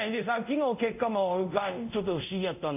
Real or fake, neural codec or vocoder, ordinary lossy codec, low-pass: fake; codec, 24 kHz, 0.5 kbps, DualCodec; none; 3.6 kHz